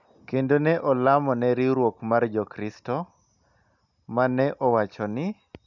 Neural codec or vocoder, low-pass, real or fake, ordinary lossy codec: none; 7.2 kHz; real; none